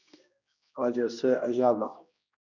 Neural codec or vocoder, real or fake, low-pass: codec, 16 kHz, 1 kbps, X-Codec, HuBERT features, trained on general audio; fake; 7.2 kHz